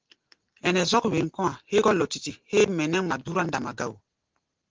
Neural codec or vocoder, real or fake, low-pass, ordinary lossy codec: none; real; 7.2 kHz; Opus, 16 kbps